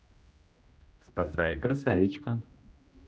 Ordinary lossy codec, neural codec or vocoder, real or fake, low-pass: none; codec, 16 kHz, 1 kbps, X-Codec, HuBERT features, trained on general audio; fake; none